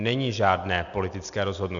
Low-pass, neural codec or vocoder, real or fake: 7.2 kHz; none; real